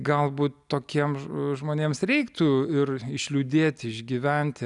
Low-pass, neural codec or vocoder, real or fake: 10.8 kHz; none; real